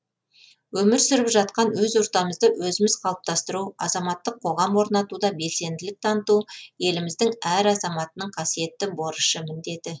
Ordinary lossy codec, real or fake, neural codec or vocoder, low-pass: none; real; none; none